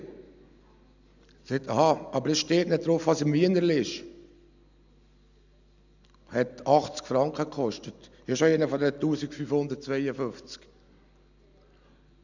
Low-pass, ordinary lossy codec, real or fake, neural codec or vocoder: 7.2 kHz; none; real; none